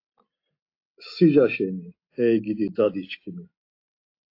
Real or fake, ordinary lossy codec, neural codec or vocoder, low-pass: real; AAC, 32 kbps; none; 5.4 kHz